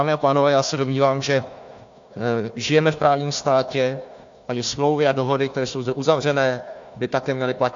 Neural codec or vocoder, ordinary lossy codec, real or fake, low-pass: codec, 16 kHz, 1 kbps, FunCodec, trained on Chinese and English, 50 frames a second; AAC, 64 kbps; fake; 7.2 kHz